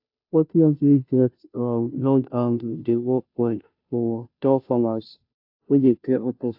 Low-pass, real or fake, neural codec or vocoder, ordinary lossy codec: 5.4 kHz; fake; codec, 16 kHz, 0.5 kbps, FunCodec, trained on Chinese and English, 25 frames a second; AAC, 48 kbps